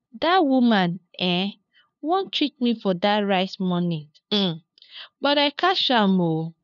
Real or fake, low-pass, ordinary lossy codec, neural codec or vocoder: fake; 7.2 kHz; none; codec, 16 kHz, 2 kbps, FunCodec, trained on LibriTTS, 25 frames a second